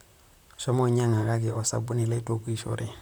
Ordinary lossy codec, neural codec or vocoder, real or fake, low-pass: none; vocoder, 44.1 kHz, 128 mel bands, Pupu-Vocoder; fake; none